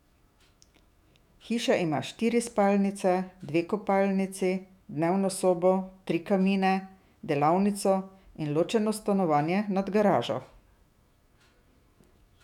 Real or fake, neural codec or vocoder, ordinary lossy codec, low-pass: fake; autoencoder, 48 kHz, 128 numbers a frame, DAC-VAE, trained on Japanese speech; none; 19.8 kHz